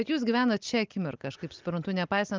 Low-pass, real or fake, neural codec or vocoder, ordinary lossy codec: 7.2 kHz; real; none; Opus, 32 kbps